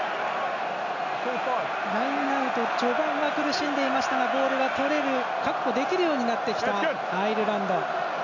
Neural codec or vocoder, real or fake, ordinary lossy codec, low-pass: none; real; none; 7.2 kHz